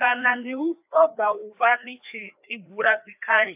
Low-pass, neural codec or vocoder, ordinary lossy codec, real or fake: 3.6 kHz; codec, 16 kHz, 2 kbps, FreqCodec, larger model; AAC, 32 kbps; fake